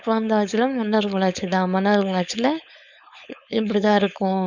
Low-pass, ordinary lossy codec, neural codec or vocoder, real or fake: 7.2 kHz; none; codec, 16 kHz, 4.8 kbps, FACodec; fake